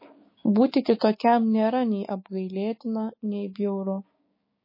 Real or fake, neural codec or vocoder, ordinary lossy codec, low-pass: fake; codec, 16 kHz, 6 kbps, DAC; MP3, 24 kbps; 5.4 kHz